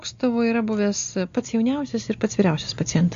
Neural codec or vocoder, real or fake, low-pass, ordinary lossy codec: none; real; 7.2 kHz; AAC, 64 kbps